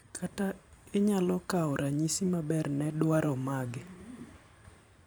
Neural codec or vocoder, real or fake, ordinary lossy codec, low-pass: vocoder, 44.1 kHz, 128 mel bands every 512 samples, BigVGAN v2; fake; none; none